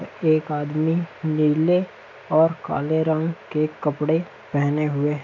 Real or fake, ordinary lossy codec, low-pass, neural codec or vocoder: real; MP3, 64 kbps; 7.2 kHz; none